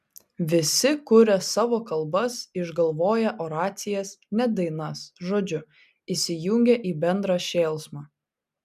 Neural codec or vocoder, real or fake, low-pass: none; real; 14.4 kHz